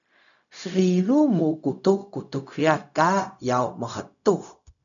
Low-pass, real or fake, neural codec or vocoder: 7.2 kHz; fake; codec, 16 kHz, 0.4 kbps, LongCat-Audio-Codec